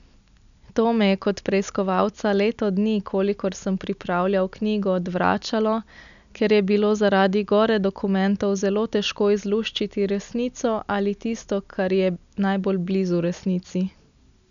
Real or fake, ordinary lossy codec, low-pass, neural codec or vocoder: real; none; 7.2 kHz; none